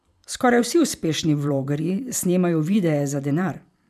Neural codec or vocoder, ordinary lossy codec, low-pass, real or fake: vocoder, 44.1 kHz, 128 mel bands every 512 samples, BigVGAN v2; none; 14.4 kHz; fake